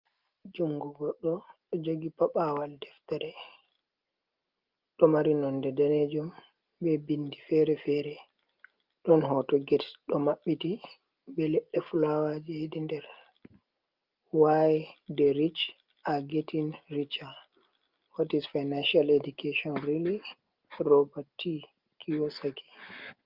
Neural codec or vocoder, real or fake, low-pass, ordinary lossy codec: none; real; 5.4 kHz; Opus, 24 kbps